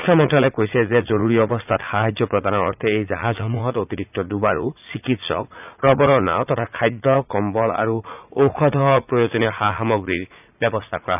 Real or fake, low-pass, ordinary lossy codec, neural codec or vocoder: fake; 3.6 kHz; none; vocoder, 44.1 kHz, 80 mel bands, Vocos